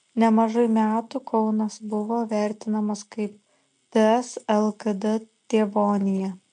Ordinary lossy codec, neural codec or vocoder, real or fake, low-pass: MP3, 48 kbps; none; real; 9.9 kHz